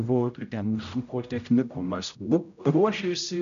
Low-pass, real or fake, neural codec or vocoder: 7.2 kHz; fake; codec, 16 kHz, 0.5 kbps, X-Codec, HuBERT features, trained on general audio